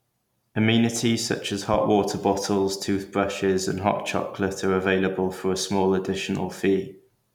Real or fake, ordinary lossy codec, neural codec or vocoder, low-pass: real; none; none; 19.8 kHz